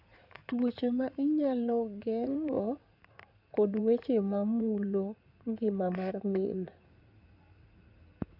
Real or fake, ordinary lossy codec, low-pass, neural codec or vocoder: fake; none; 5.4 kHz; codec, 16 kHz in and 24 kHz out, 2.2 kbps, FireRedTTS-2 codec